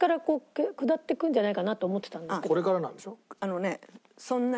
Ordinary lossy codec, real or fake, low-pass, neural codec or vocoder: none; real; none; none